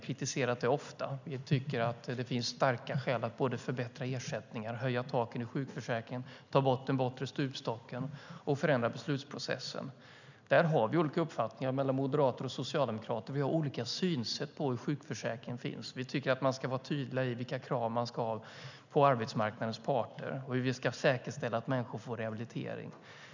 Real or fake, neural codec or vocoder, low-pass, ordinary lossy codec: real; none; 7.2 kHz; none